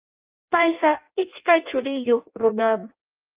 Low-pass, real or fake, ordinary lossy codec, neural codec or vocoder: 3.6 kHz; fake; Opus, 24 kbps; codec, 16 kHz in and 24 kHz out, 0.6 kbps, FireRedTTS-2 codec